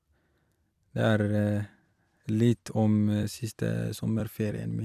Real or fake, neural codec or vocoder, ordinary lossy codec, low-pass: fake; vocoder, 44.1 kHz, 128 mel bands every 256 samples, BigVGAN v2; none; 14.4 kHz